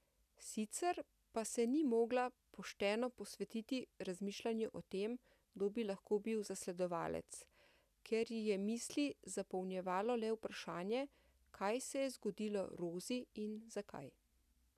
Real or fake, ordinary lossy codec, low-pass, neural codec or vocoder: real; none; 14.4 kHz; none